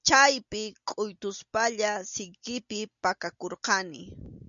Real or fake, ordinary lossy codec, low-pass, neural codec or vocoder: real; MP3, 96 kbps; 7.2 kHz; none